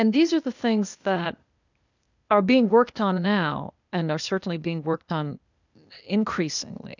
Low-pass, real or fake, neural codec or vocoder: 7.2 kHz; fake; codec, 16 kHz, 0.8 kbps, ZipCodec